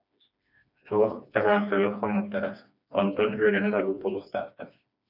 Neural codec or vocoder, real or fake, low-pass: codec, 16 kHz, 2 kbps, FreqCodec, smaller model; fake; 5.4 kHz